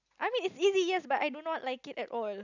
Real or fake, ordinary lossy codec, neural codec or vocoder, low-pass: real; none; none; 7.2 kHz